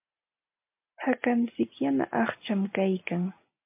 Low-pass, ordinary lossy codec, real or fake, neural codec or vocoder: 3.6 kHz; MP3, 32 kbps; real; none